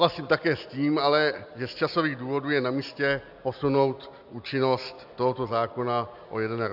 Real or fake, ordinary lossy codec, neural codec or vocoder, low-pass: real; AAC, 48 kbps; none; 5.4 kHz